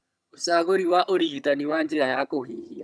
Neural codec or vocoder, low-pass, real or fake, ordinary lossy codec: vocoder, 22.05 kHz, 80 mel bands, HiFi-GAN; none; fake; none